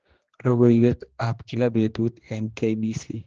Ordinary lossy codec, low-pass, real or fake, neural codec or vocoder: Opus, 16 kbps; 7.2 kHz; fake; codec, 16 kHz, 1 kbps, X-Codec, HuBERT features, trained on general audio